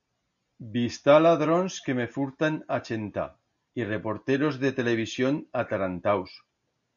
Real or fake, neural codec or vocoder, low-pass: real; none; 7.2 kHz